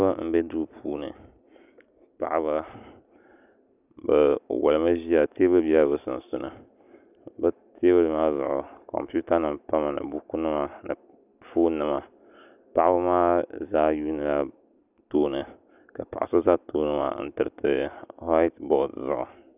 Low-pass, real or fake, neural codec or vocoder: 3.6 kHz; real; none